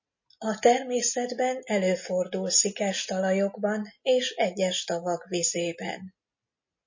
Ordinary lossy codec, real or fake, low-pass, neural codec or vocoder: MP3, 32 kbps; real; 7.2 kHz; none